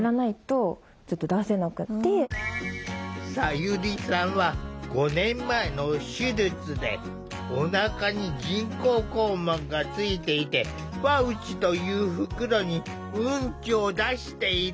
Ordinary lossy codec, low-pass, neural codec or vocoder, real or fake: none; none; none; real